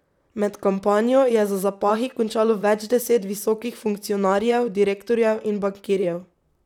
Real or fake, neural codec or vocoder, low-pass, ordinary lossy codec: fake; vocoder, 44.1 kHz, 128 mel bands, Pupu-Vocoder; 19.8 kHz; none